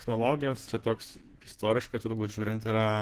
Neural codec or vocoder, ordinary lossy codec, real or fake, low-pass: codec, 32 kHz, 1.9 kbps, SNAC; Opus, 16 kbps; fake; 14.4 kHz